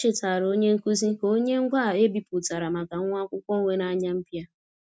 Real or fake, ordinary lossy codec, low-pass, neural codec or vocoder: real; none; none; none